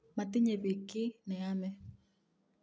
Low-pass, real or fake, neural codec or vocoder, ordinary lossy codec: none; real; none; none